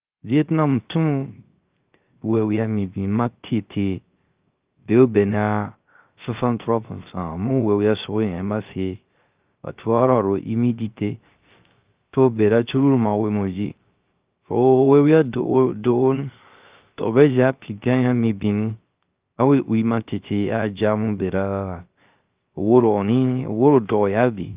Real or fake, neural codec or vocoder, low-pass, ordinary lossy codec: fake; codec, 16 kHz, 0.3 kbps, FocalCodec; 3.6 kHz; Opus, 32 kbps